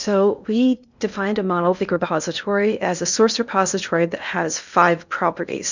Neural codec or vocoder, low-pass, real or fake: codec, 16 kHz in and 24 kHz out, 0.6 kbps, FocalCodec, streaming, 2048 codes; 7.2 kHz; fake